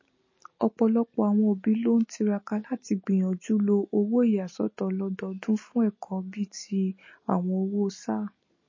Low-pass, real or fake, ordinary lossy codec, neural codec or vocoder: 7.2 kHz; real; MP3, 32 kbps; none